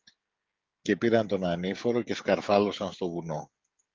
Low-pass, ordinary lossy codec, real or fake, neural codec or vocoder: 7.2 kHz; Opus, 32 kbps; fake; codec, 16 kHz, 16 kbps, FreqCodec, smaller model